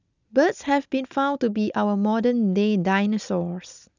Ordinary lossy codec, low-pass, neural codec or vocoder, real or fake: none; 7.2 kHz; none; real